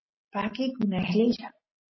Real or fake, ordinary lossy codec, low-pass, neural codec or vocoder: real; MP3, 24 kbps; 7.2 kHz; none